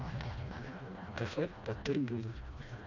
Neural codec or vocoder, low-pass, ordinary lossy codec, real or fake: codec, 16 kHz, 1 kbps, FreqCodec, smaller model; 7.2 kHz; none; fake